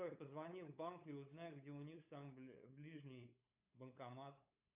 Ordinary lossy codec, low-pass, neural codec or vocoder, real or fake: AAC, 32 kbps; 3.6 kHz; codec, 16 kHz, 8 kbps, FunCodec, trained on LibriTTS, 25 frames a second; fake